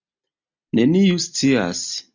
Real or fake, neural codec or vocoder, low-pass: real; none; 7.2 kHz